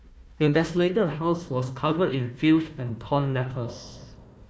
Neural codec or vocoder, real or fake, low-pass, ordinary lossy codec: codec, 16 kHz, 1 kbps, FunCodec, trained on Chinese and English, 50 frames a second; fake; none; none